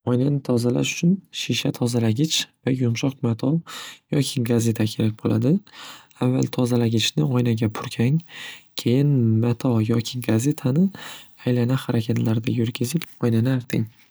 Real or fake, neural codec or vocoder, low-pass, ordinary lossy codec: real; none; none; none